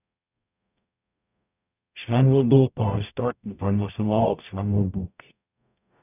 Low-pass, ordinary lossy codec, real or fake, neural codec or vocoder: 3.6 kHz; none; fake; codec, 44.1 kHz, 0.9 kbps, DAC